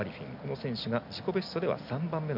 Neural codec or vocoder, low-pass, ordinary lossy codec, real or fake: none; 5.4 kHz; none; real